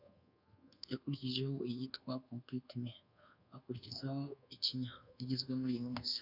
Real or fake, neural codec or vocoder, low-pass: fake; codec, 24 kHz, 1.2 kbps, DualCodec; 5.4 kHz